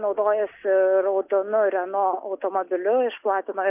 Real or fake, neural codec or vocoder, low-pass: real; none; 3.6 kHz